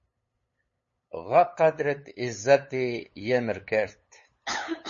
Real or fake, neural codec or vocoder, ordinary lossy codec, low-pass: fake; codec, 16 kHz, 8 kbps, FunCodec, trained on LibriTTS, 25 frames a second; MP3, 32 kbps; 7.2 kHz